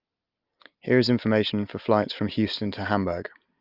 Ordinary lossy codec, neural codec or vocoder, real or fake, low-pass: Opus, 32 kbps; none; real; 5.4 kHz